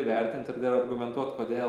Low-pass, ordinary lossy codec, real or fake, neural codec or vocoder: 19.8 kHz; Opus, 32 kbps; fake; vocoder, 44.1 kHz, 128 mel bands every 512 samples, BigVGAN v2